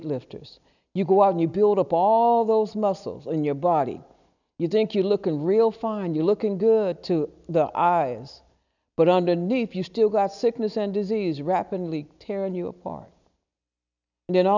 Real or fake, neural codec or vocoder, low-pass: real; none; 7.2 kHz